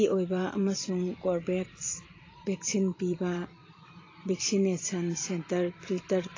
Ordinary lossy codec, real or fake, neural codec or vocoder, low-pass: AAC, 32 kbps; fake; vocoder, 44.1 kHz, 128 mel bands every 512 samples, BigVGAN v2; 7.2 kHz